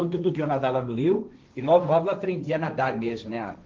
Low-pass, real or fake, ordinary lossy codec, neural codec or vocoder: 7.2 kHz; fake; Opus, 32 kbps; codec, 16 kHz, 1.1 kbps, Voila-Tokenizer